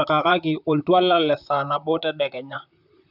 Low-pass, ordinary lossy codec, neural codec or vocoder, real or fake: 5.4 kHz; none; vocoder, 44.1 kHz, 128 mel bands, Pupu-Vocoder; fake